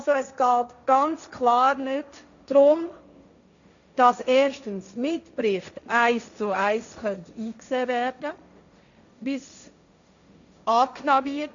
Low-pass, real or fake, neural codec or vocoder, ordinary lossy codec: 7.2 kHz; fake; codec, 16 kHz, 1.1 kbps, Voila-Tokenizer; none